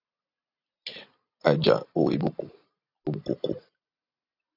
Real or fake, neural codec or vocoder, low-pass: fake; vocoder, 44.1 kHz, 128 mel bands every 256 samples, BigVGAN v2; 5.4 kHz